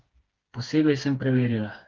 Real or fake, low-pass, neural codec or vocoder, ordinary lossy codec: fake; 7.2 kHz; codec, 16 kHz, 4 kbps, FreqCodec, smaller model; Opus, 16 kbps